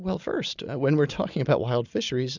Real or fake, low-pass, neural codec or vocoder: real; 7.2 kHz; none